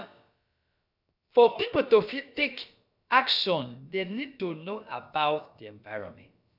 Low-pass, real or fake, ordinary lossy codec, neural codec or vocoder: 5.4 kHz; fake; none; codec, 16 kHz, about 1 kbps, DyCAST, with the encoder's durations